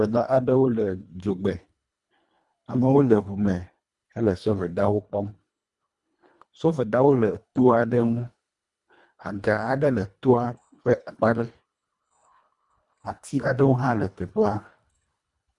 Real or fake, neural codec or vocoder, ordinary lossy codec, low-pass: fake; codec, 24 kHz, 1.5 kbps, HILCodec; Opus, 64 kbps; 10.8 kHz